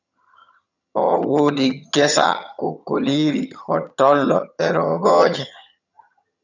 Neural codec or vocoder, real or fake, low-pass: vocoder, 22.05 kHz, 80 mel bands, HiFi-GAN; fake; 7.2 kHz